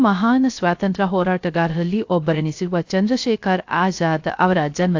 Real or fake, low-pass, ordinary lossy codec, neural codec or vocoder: fake; 7.2 kHz; AAC, 48 kbps; codec, 16 kHz, 0.3 kbps, FocalCodec